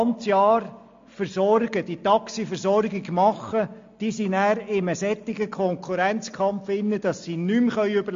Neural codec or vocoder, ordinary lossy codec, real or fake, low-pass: none; MP3, 48 kbps; real; 7.2 kHz